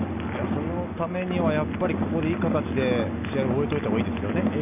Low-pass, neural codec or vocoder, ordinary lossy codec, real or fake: 3.6 kHz; none; none; real